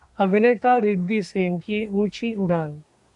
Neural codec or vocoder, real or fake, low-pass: codec, 24 kHz, 1 kbps, SNAC; fake; 10.8 kHz